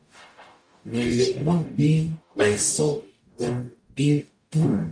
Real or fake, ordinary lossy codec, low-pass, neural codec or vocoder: fake; MP3, 48 kbps; 9.9 kHz; codec, 44.1 kHz, 0.9 kbps, DAC